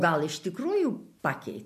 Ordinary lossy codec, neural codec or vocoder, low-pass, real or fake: MP3, 64 kbps; none; 14.4 kHz; real